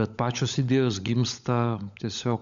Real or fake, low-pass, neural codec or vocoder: fake; 7.2 kHz; codec, 16 kHz, 16 kbps, FunCodec, trained on LibriTTS, 50 frames a second